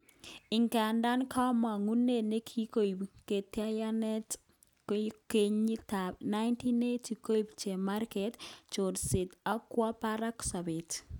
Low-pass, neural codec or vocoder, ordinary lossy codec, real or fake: 19.8 kHz; none; none; real